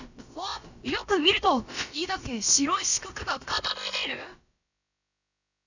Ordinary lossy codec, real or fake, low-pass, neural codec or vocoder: none; fake; 7.2 kHz; codec, 16 kHz, about 1 kbps, DyCAST, with the encoder's durations